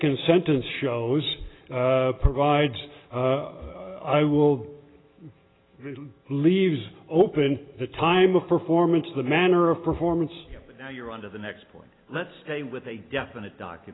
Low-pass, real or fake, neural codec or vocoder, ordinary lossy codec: 7.2 kHz; real; none; AAC, 16 kbps